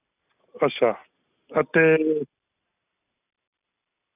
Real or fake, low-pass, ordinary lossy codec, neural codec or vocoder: real; 3.6 kHz; none; none